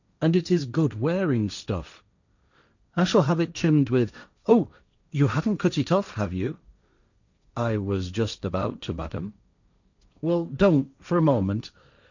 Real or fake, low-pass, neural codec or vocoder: fake; 7.2 kHz; codec, 16 kHz, 1.1 kbps, Voila-Tokenizer